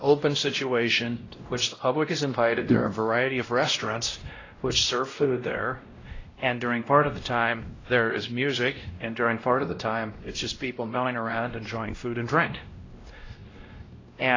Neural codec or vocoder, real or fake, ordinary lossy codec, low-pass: codec, 16 kHz, 0.5 kbps, X-Codec, WavLM features, trained on Multilingual LibriSpeech; fake; AAC, 32 kbps; 7.2 kHz